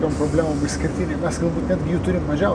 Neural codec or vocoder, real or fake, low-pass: none; real; 9.9 kHz